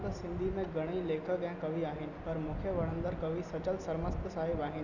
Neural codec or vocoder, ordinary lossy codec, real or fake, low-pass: none; none; real; 7.2 kHz